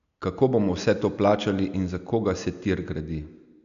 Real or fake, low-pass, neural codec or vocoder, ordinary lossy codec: real; 7.2 kHz; none; none